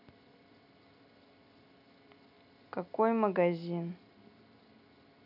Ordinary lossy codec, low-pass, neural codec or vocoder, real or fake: none; 5.4 kHz; none; real